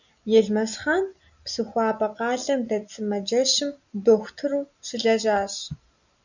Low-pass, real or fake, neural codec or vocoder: 7.2 kHz; real; none